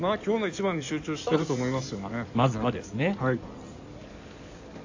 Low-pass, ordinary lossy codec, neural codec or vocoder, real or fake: 7.2 kHz; AAC, 48 kbps; codec, 16 kHz in and 24 kHz out, 2.2 kbps, FireRedTTS-2 codec; fake